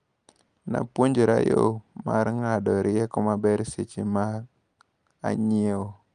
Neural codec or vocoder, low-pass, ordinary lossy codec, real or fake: none; 9.9 kHz; Opus, 32 kbps; real